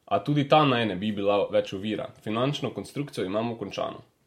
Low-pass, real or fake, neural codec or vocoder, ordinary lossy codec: 19.8 kHz; real; none; MP3, 64 kbps